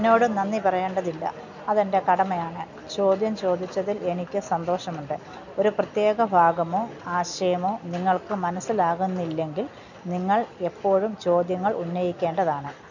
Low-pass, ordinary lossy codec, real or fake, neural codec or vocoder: 7.2 kHz; none; real; none